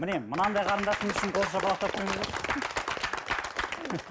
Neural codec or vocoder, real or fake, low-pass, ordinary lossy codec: none; real; none; none